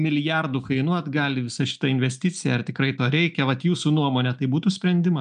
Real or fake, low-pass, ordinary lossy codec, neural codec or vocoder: real; 9.9 kHz; MP3, 96 kbps; none